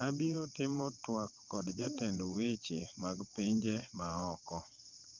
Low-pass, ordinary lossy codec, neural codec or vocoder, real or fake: 7.2 kHz; Opus, 24 kbps; vocoder, 22.05 kHz, 80 mel bands, WaveNeXt; fake